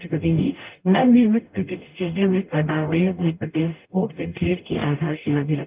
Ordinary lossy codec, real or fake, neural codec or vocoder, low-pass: Opus, 24 kbps; fake; codec, 44.1 kHz, 0.9 kbps, DAC; 3.6 kHz